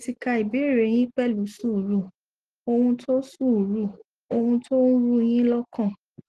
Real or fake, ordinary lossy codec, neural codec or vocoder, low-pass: real; Opus, 16 kbps; none; 10.8 kHz